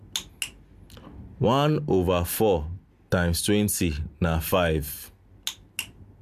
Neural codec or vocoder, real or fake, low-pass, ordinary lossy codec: none; real; 14.4 kHz; MP3, 96 kbps